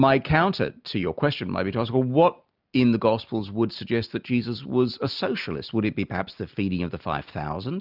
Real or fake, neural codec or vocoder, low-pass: real; none; 5.4 kHz